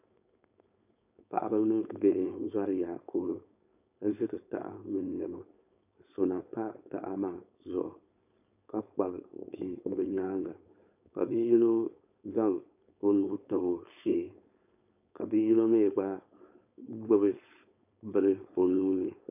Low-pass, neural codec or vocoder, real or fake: 3.6 kHz; codec, 16 kHz, 4.8 kbps, FACodec; fake